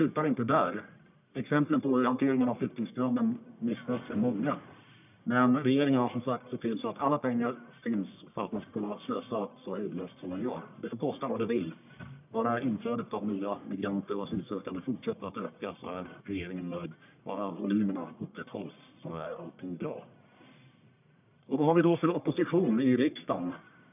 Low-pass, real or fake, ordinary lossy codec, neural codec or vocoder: 3.6 kHz; fake; none; codec, 44.1 kHz, 1.7 kbps, Pupu-Codec